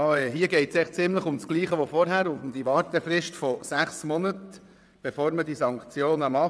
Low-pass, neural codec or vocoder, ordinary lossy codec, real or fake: none; vocoder, 22.05 kHz, 80 mel bands, WaveNeXt; none; fake